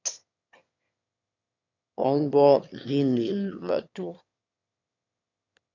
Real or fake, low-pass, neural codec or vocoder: fake; 7.2 kHz; autoencoder, 22.05 kHz, a latent of 192 numbers a frame, VITS, trained on one speaker